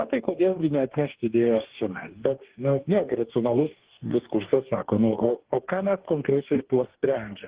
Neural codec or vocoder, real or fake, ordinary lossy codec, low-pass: codec, 44.1 kHz, 2.6 kbps, DAC; fake; Opus, 16 kbps; 3.6 kHz